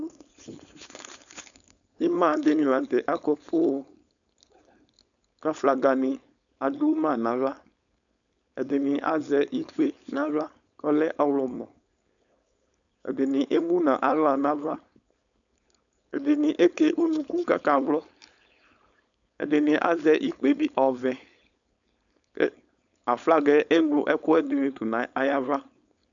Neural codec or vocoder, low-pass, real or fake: codec, 16 kHz, 4.8 kbps, FACodec; 7.2 kHz; fake